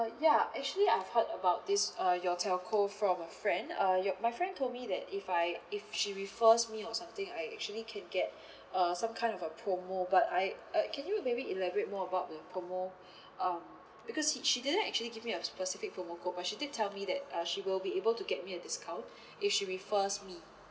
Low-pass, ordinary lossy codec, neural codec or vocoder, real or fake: none; none; none; real